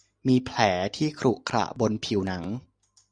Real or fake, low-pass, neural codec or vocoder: real; 9.9 kHz; none